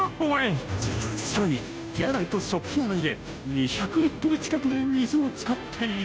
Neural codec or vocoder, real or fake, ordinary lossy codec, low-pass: codec, 16 kHz, 0.5 kbps, FunCodec, trained on Chinese and English, 25 frames a second; fake; none; none